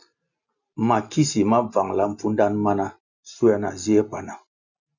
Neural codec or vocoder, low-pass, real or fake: none; 7.2 kHz; real